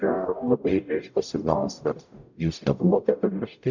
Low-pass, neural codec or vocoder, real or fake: 7.2 kHz; codec, 44.1 kHz, 0.9 kbps, DAC; fake